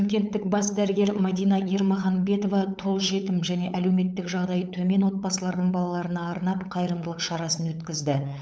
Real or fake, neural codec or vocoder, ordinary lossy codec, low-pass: fake; codec, 16 kHz, 8 kbps, FunCodec, trained on LibriTTS, 25 frames a second; none; none